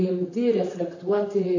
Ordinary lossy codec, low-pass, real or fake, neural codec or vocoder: AAC, 48 kbps; 7.2 kHz; fake; vocoder, 44.1 kHz, 128 mel bands, Pupu-Vocoder